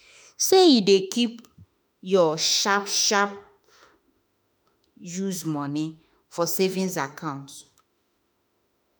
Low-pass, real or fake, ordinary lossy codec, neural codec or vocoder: none; fake; none; autoencoder, 48 kHz, 32 numbers a frame, DAC-VAE, trained on Japanese speech